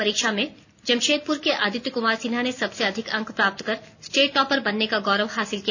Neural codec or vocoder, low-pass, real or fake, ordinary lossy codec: none; 7.2 kHz; real; none